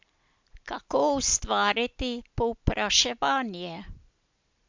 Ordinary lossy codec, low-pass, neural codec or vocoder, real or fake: MP3, 64 kbps; 7.2 kHz; none; real